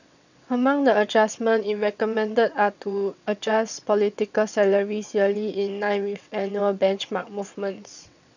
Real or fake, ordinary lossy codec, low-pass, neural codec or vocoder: fake; none; 7.2 kHz; vocoder, 22.05 kHz, 80 mel bands, WaveNeXt